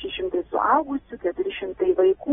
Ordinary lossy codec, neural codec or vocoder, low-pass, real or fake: AAC, 16 kbps; none; 19.8 kHz; real